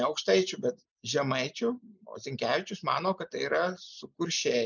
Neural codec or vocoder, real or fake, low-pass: none; real; 7.2 kHz